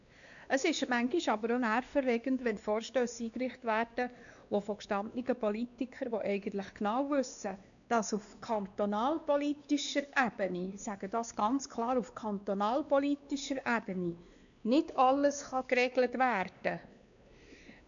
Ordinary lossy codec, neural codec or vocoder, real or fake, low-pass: none; codec, 16 kHz, 2 kbps, X-Codec, WavLM features, trained on Multilingual LibriSpeech; fake; 7.2 kHz